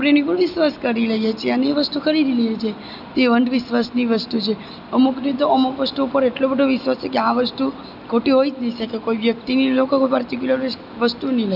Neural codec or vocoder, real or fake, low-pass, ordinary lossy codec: none; real; 5.4 kHz; none